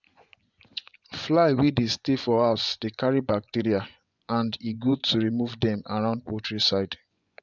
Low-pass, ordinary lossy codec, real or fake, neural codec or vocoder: 7.2 kHz; none; fake; vocoder, 24 kHz, 100 mel bands, Vocos